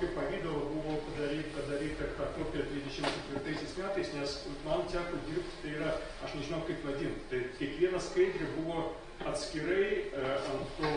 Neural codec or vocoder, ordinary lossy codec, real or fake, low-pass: none; AAC, 32 kbps; real; 9.9 kHz